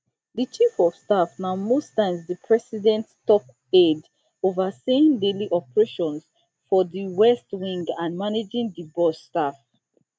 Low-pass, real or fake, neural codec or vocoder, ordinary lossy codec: none; real; none; none